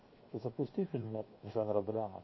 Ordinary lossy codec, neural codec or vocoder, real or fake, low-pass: MP3, 24 kbps; codec, 16 kHz, 0.7 kbps, FocalCodec; fake; 7.2 kHz